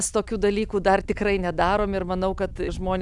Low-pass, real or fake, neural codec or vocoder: 10.8 kHz; real; none